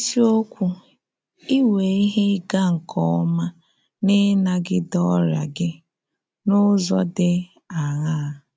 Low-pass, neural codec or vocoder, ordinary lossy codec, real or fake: none; none; none; real